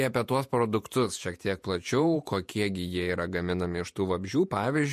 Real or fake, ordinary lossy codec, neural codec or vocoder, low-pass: fake; MP3, 64 kbps; vocoder, 48 kHz, 128 mel bands, Vocos; 14.4 kHz